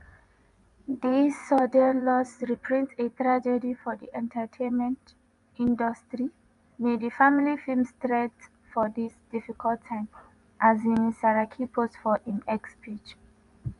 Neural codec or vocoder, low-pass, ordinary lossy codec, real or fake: vocoder, 24 kHz, 100 mel bands, Vocos; 10.8 kHz; none; fake